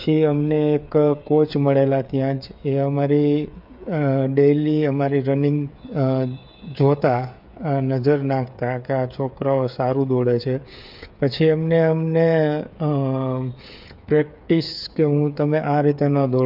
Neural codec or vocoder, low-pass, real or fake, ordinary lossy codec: codec, 16 kHz, 8 kbps, FreqCodec, smaller model; 5.4 kHz; fake; MP3, 48 kbps